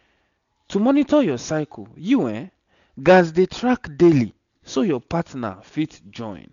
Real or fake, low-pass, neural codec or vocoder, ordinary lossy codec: real; 7.2 kHz; none; none